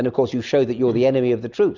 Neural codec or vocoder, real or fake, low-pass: none; real; 7.2 kHz